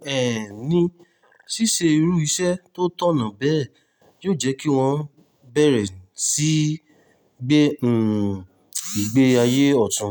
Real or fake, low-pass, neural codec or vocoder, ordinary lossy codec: real; none; none; none